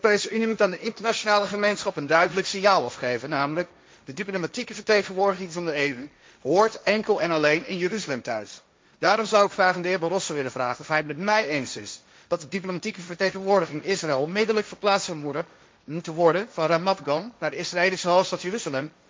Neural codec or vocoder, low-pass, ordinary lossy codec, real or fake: codec, 16 kHz, 1.1 kbps, Voila-Tokenizer; none; none; fake